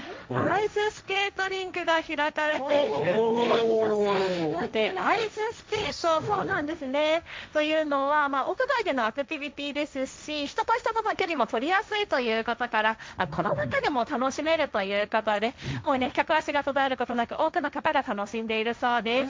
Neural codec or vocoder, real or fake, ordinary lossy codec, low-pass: codec, 16 kHz, 1.1 kbps, Voila-Tokenizer; fake; none; none